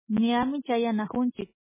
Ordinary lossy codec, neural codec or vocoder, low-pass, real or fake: MP3, 16 kbps; none; 3.6 kHz; real